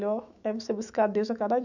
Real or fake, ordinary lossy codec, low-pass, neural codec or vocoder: fake; none; 7.2 kHz; autoencoder, 48 kHz, 128 numbers a frame, DAC-VAE, trained on Japanese speech